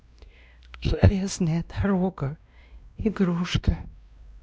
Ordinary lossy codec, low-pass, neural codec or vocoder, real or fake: none; none; codec, 16 kHz, 1 kbps, X-Codec, WavLM features, trained on Multilingual LibriSpeech; fake